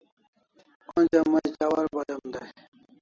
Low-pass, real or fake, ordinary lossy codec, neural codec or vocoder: 7.2 kHz; real; AAC, 32 kbps; none